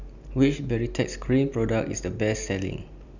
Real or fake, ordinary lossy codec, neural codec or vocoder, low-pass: real; none; none; 7.2 kHz